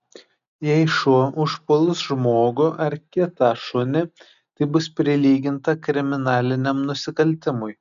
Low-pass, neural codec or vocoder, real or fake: 7.2 kHz; none; real